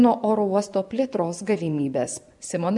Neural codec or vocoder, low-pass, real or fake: vocoder, 24 kHz, 100 mel bands, Vocos; 10.8 kHz; fake